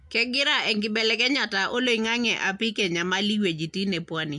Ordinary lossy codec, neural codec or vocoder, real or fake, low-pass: MP3, 64 kbps; none; real; 10.8 kHz